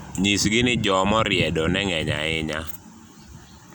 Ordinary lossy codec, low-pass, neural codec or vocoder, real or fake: none; none; none; real